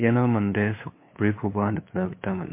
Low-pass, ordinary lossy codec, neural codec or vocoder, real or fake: 3.6 kHz; MP3, 24 kbps; codec, 16 kHz, 2 kbps, FunCodec, trained on LibriTTS, 25 frames a second; fake